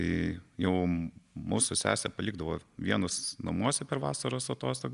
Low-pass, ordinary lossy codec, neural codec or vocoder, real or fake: 10.8 kHz; Opus, 64 kbps; none; real